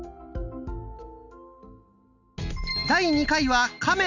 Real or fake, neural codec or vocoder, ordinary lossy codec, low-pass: real; none; none; 7.2 kHz